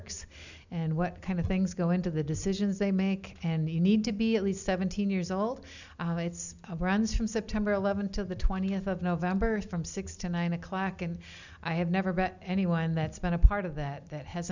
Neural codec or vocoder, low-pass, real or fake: none; 7.2 kHz; real